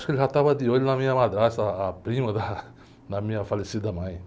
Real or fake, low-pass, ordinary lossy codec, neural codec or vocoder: real; none; none; none